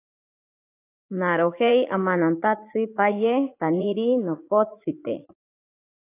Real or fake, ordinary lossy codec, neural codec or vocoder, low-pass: fake; AAC, 24 kbps; vocoder, 44.1 kHz, 80 mel bands, Vocos; 3.6 kHz